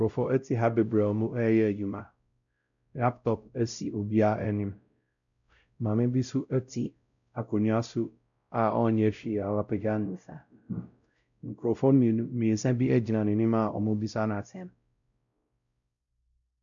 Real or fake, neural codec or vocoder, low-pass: fake; codec, 16 kHz, 0.5 kbps, X-Codec, WavLM features, trained on Multilingual LibriSpeech; 7.2 kHz